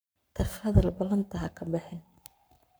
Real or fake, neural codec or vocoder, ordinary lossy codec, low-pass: fake; codec, 44.1 kHz, 7.8 kbps, Pupu-Codec; none; none